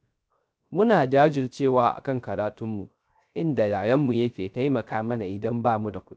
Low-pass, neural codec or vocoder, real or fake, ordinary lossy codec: none; codec, 16 kHz, 0.3 kbps, FocalCodec; fake; none